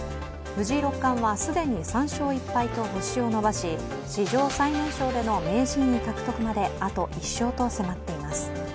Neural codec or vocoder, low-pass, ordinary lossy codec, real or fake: none; none; none; real